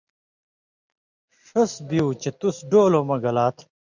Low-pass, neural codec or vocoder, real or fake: 7.2 kHz; none; real